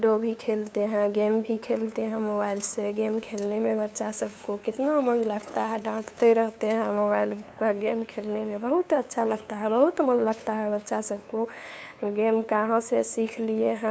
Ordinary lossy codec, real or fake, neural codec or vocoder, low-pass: none; fake; codec, 16 kHz, 2 kbps, FunCodec, trained on LibriTTS, 25 frames a second; none